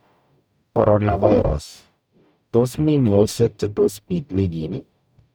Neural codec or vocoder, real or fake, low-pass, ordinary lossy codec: codec, 44.1 kHz, 0.9 kbps, DAC; fake; none; none